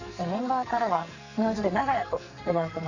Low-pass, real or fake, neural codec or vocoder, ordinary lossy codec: 7.2 kHz; fake; codec, 44.1 kHz, 2.6 kbps, SNAC; none